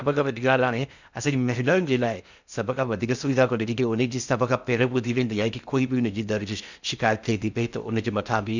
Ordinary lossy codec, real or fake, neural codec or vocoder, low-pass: none; fake; codec, 16 kHz in and 24 kHz out, 0.6 kbps, FocalCodec, streaming, 2048 codes; 7.2 kHz